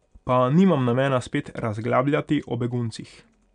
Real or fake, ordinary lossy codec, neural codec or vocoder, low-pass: real; none; none; 9.9 kHz